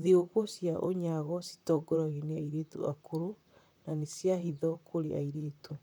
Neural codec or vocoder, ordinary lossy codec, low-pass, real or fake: vocoder, 44.1 kHz, 128 mel bands, Pupu-Vocoder; none; none; fake